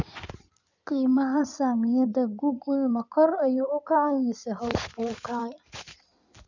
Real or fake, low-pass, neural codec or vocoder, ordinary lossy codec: fake; 7.2 kHz; codec, 16 kHz in and 24 kHz out, 2.2 kbps, FireRedTTS-2 codec; none